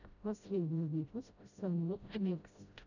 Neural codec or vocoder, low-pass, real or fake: codec, 16 kHz, 0.5 kbps, FreqCodec, smaller model; 7.2 kHz; fake